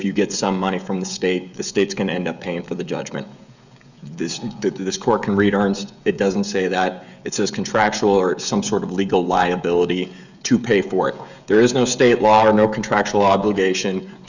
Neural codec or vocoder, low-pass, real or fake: codec, 16 kHz, 16 kbps, FreqCodec, smaller model; 7.2 kHz; fake